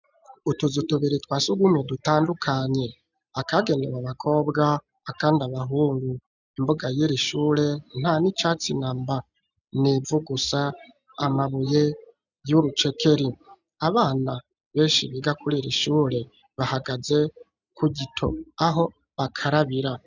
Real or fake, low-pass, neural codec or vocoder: real; 7.2 kHz; none